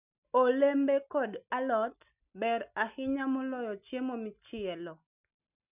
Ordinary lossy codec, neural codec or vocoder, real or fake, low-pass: none; none; real; 3.6 kHz